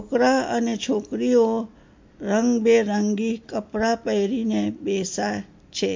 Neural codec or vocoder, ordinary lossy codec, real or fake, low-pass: none; MP3, 48 kbps; real; 7.2 kHz